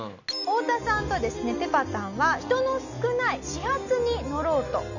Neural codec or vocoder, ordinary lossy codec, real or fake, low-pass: none; none; real; 7.2 kHz